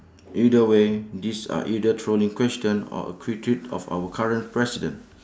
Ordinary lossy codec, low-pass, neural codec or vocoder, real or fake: none; none; none; real